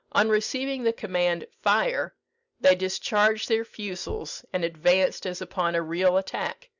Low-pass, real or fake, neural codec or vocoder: 7.2 kHz; real; none